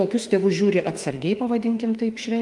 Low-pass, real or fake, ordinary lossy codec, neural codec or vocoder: 10.8 kHz; fake; Opus, 32 kbps; autoencoder, 48 kHz, 32 numbers a frame, DAC-VAE, trained on Japanese speech